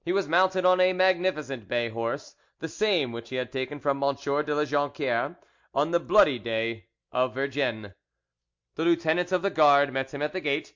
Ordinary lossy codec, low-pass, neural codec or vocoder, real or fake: MP3, 48 kbps; 7.2 kHz; none; real